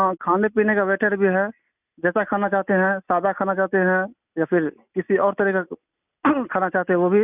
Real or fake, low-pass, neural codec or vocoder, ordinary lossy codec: real; 3.6 kHz; none; none